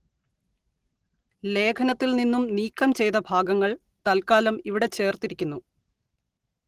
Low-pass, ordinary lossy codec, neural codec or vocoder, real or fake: 14.4 kHz; Opus, 16 kbps; none; real